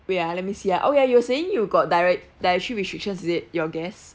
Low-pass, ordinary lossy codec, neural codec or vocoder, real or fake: none; none; none; real